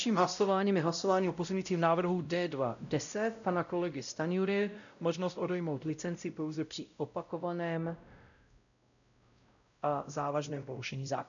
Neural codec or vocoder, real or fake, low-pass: codec, 16 kHz, 0.5 kbps, X-Codec, WavLM features, trained on Multilingual LibriSpeech; fake; 7.2 kHz